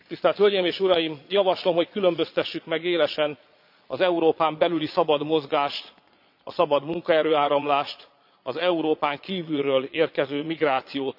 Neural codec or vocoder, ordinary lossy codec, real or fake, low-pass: vocoder, 22.05 kHz, 80 mel bands, Vocos; AAC, 48 kbps; fake; 5.4 kHz